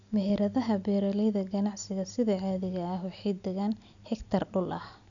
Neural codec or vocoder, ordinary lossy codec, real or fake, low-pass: none; none; real; 7.2 kHz